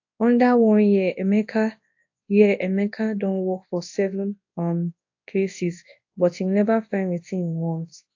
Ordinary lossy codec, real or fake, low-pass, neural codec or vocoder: AAC, 48 kbps; fake; 7.2 kHz; codec, 24 kHz, 0.9 kbps, WavTokenizer, large speech release